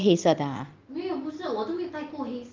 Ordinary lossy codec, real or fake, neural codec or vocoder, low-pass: Opus, 32 kbps; real; none; 7.2 kHz